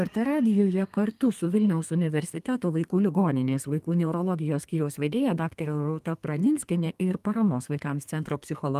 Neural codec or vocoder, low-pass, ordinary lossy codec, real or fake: codec, 32 kHz, 1.9 kbps, SNAC; 14.4 kHz; Opus, 32 kbps; fake